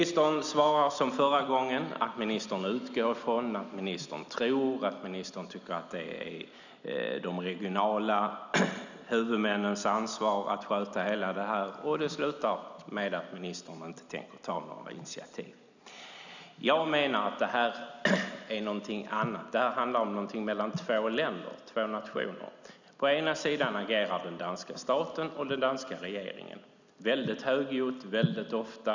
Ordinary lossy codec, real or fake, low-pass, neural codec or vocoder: none; real; 7.2 kHz; none